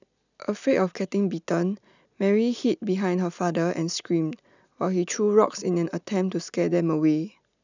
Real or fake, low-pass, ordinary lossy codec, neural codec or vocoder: real; 7.2 kHz; none; none